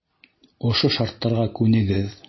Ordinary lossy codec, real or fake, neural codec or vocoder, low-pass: MP3, 24 kbps; real; none; 7.2 kHz